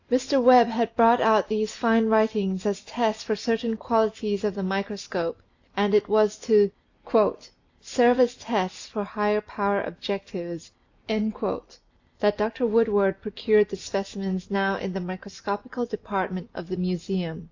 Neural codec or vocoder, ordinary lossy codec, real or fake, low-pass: none; AAC, 48 kbps; real; 7.2 kHz